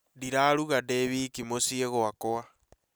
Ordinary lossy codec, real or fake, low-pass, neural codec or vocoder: none; real; none; none